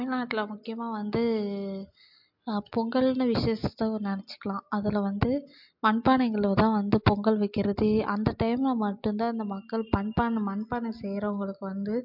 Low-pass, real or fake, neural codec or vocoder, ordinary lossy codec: 5.4 kHz; real; none; MP3, 48 kbps